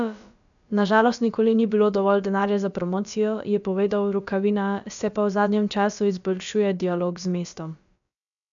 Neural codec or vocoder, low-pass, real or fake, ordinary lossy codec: codec, 16 kHz, about 1 kbps, DyCAST, with the encoder's durations; 7.2 kHz; fake; MP3, 96 kbps